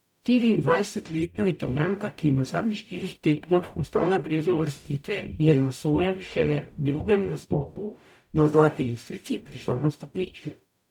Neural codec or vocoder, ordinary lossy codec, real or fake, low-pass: codec, 44.1 kHz, 0.9 kbps, DAC; none; fake; 19.8 kHz